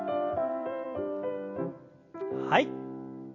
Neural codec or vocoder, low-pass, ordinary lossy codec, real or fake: vocoder, 44.1 kHz, 128 mel bands every 256 samples, BigVGAN v2; 7.2 kHz; AAC, 48 kbps; fake